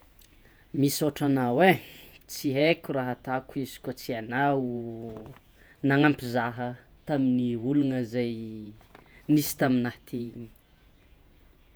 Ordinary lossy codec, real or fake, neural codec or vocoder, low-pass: none; fake; vocoder, 48 kHz, 128 mel bands, Vocos; none